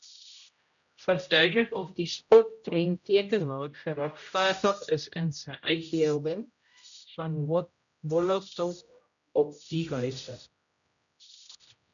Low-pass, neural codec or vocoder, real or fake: 7.2 kHz; codec, 16 kHz, 0.5 kbps, X-Codec, HuBERT features, trained on general audio; fake